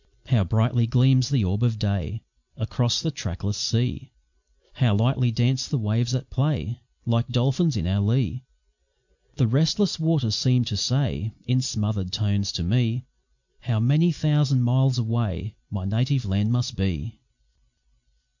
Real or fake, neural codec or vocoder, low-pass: real; none; 7.2 kHz